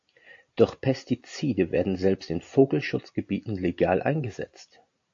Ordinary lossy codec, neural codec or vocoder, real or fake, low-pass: AAC, 48 kbps; none; real; 7.2 kHz